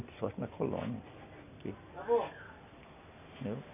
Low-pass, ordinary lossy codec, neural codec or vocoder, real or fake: 3.6 kHz; none; none; real